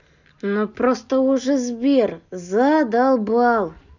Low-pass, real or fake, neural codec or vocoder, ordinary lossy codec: 7.2 kHz; real; none; none